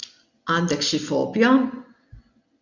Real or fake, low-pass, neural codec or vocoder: real; 7.2 kHz; none